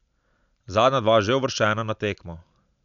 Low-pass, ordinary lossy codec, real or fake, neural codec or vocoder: 7.2 kHz; none; real; none